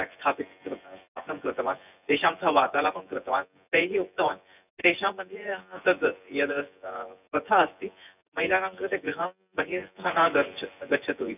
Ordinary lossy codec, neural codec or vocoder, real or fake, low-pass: none; vocoder, 24 kHz, 100 mel bands, Vocos; fake; 3.6 kHz